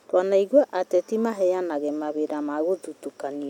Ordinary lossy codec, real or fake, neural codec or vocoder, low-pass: none; real; none; 19.8 kHz